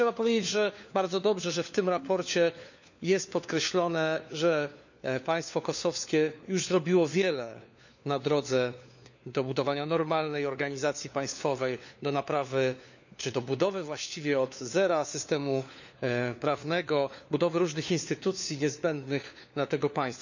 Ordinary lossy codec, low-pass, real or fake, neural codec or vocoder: none; 7.2 kHz; fake; codec, 16 kHz, 4 kbps, FunCodec, trained on LibriTTS, 50 frames a second